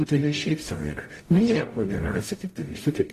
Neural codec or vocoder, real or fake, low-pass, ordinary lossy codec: codec, 44.1 kHz, 0.9 kbps, DAC; fake; 14.4 kHz; AAC, 48 kbps